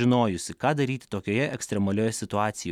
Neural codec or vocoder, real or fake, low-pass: none; real; 19.8 kHz